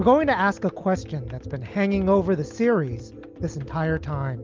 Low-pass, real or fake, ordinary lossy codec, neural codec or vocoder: 7.2 kHz; real; Opus, 32 kbps; none